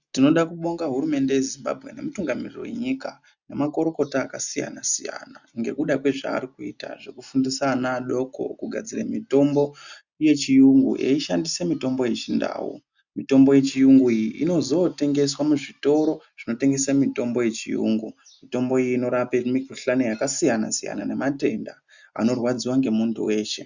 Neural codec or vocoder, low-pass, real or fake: none; 7.2 kHz; real